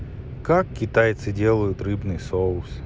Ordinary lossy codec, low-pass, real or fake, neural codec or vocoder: none; none; real; none